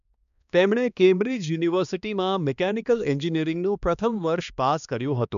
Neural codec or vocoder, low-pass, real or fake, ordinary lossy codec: codec, 16 kHz, 2 kbps, X-Codec, HuBERT features, trained on balanced general audio; 7.2 kHz; fake; none